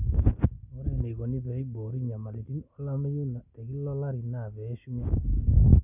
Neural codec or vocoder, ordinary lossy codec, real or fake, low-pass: none; none; real; 3.6 kHz